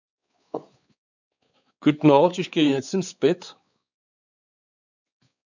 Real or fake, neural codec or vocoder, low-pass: fake; codec, 16 kHz, 4 kbps, X-Codec, WavLM features, trained on Multilingual LibriSpeech; 7.2 kHz